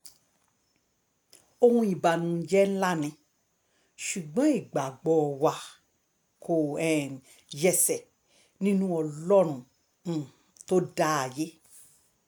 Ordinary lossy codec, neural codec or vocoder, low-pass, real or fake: none; none; none; real